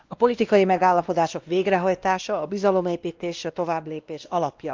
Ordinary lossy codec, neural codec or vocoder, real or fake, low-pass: Opus, 32 kbps; codec, 16 kHz, 1 kbps, X-Codec, WavLM features, trained on Multilingual LibriSpeech; fake; 7.2 kHz